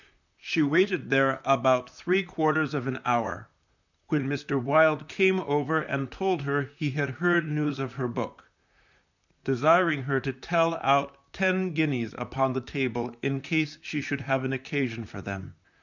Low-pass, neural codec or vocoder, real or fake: 7.2 kHz; vocoder, 44.1 kHz, 128 mel bands, Pupu-Vocoder; fake